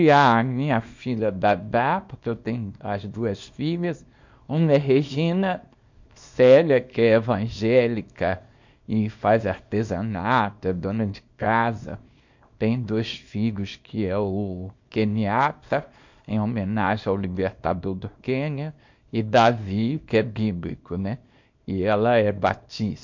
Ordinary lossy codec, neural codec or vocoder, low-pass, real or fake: MP3, 48 kbps; codec, 24 kHz, 0.9 kbps, WavTokenizer, small release; 7.2 kHz; fake